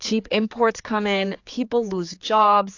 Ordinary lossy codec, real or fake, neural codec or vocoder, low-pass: AAC, 48 kbps; fake; codec, 16 kHz, 4 kbps, X-Codec, HuBERT features, trained on general audio; 7.2 kHz